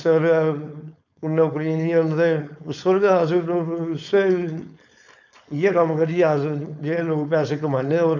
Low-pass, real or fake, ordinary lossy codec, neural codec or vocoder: 7.2 kHz; fake; none; codec, 16 kHz, 4.8 kbps, FACodec